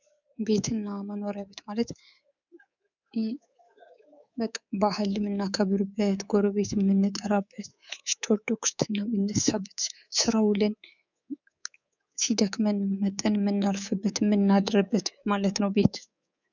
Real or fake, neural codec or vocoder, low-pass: fake; codec, 24 kHz, 3.1 kbps, DualCodec; 7.2 kHz